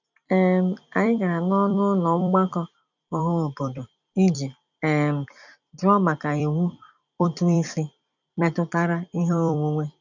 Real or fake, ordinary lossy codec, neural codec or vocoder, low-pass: fake; none; vocoder, 44.1 kHz, 128 mel bands every 256 samples, BigVGAN v2; 7.2 kHz